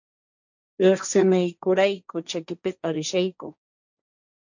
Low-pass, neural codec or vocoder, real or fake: 7.2 kHz; codec, 16 kHz, 1.1 kbps, Voila-Tokenizer; fake